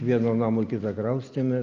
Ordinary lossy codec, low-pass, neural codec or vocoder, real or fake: Opus, 24 kbps; 7.2 kHz; none; real